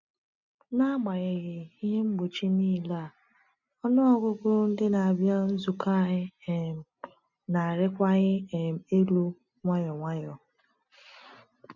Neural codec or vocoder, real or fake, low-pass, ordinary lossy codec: none; real; 7.2 kHz; none